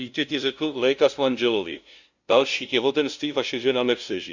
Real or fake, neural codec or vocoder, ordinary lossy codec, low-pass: fake; codec, 16 kHz, 0.5 kbps, FunCodec, trained on LibriTTS, 25 frames a second; Opus, 64 kbps; 7.2 kHz